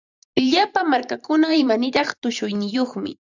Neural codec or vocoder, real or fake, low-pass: vocoder, 44.1 kHz, 128 mel bands every 512 samples, BigVGAN v2; fake; 7.2 kHz